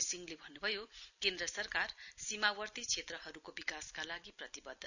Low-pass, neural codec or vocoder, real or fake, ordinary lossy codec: 7.2 kHz; none; real; none